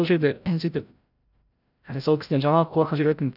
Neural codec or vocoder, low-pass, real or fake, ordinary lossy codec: codec, 16 kHz, 0.5 kbps, FreqCodec, larger model; 5.4 kHz; fake; none